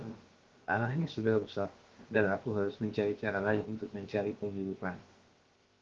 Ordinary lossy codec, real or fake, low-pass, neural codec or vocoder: Opus, 16 kbps; fake; 7.2 kHz; codec, 16 kHz, about 1 kbps, DyCAST, with the encoder's durations